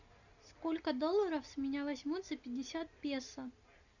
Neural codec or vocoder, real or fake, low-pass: none; real; 7.2 kHz